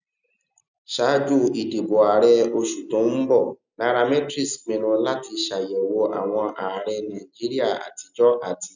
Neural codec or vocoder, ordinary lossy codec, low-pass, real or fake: none; none; 7.2 kHz; real